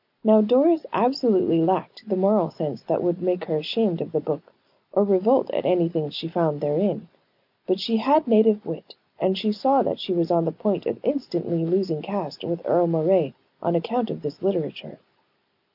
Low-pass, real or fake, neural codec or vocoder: 5.4 kHz; real; none